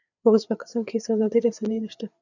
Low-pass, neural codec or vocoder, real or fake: 7.2 kHz; codec, 16 kHz, 4 kbps, FreqCodec, larger model; fake